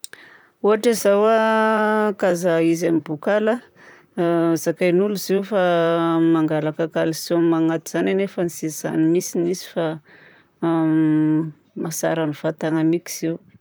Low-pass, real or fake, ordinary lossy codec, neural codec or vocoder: none; fake; none; vocoder, 44.1 kHz, 128 mel bands, Pupu-Vocoder